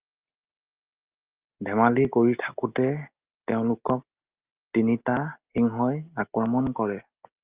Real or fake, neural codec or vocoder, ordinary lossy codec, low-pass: real; none; Opus, 32 kbps; 3.6 kHz